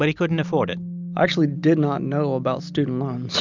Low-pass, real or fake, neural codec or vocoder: 7.2 kHz; real; none